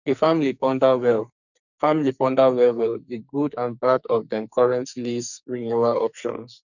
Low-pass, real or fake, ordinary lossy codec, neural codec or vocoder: 7.2 kHz; fake; none; codec, 44.1 kHz, 2.6 kbps, SNAC